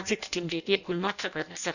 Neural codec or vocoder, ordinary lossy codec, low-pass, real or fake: codec, 16 kHz in and 24 kHz out, 0.6 kbps, FireRedTTS-2 codec; none; 7.2 kHz; fake